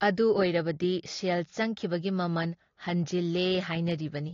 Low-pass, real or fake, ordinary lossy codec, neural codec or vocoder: 7.2 kHz; real; AAC, 32 kbps; none